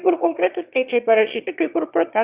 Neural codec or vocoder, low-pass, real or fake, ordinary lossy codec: autoencoder, 22.05 kHz, a latent of 192 numbers a frame, VITS, trained on one speaker; 3.6 kHz; fake; Opus, 64 kbps